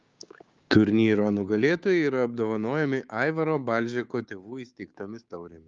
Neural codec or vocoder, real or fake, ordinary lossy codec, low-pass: codec, 16 kHz, 6 kbps, DAC; fake; Opus, 32 kbps; 7.2 kHz